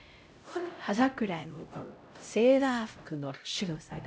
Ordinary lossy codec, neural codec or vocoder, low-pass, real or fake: none; codec, 16 kHz, 0.5 kbps, X-Codec, HuBERT features, trained on LibriSpeech; none; fake